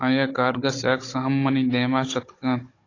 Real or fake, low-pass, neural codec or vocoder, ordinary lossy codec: fake; 7.2 kHz; codec, 16 kHz, 16 kbps, FunCodec, trained on Chinese and English, 50 frames a second; AAC, 32 kbps